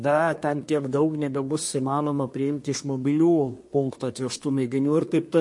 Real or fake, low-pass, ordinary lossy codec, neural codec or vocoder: fake; 10.8 kHz; MP3, 48 kbps; codec, 24 kHz, 1 kbps, SNAC